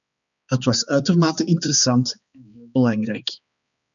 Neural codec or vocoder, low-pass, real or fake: codec, 16 kHz, 2 kbps, X-Codec, HuBERT features, trained on balanced general audio; 7.2 kHz; fake